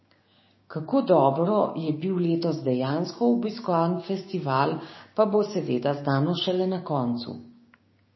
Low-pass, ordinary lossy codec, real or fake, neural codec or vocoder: 7.2 kHz; MP3, 24 kbps; fake; codec, 16 kHz, 6 kbps, DAC